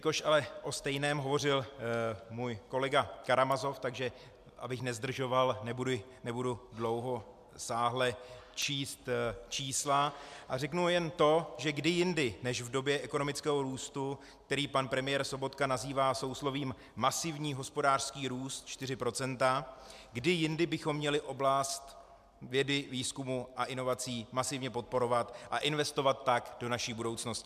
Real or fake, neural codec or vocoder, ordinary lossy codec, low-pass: real; none; MP3, 96 kbps; 14.4 kHz